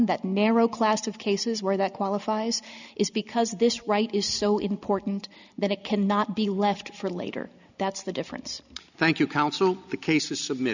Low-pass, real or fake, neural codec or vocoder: 7.2 kHz; real; none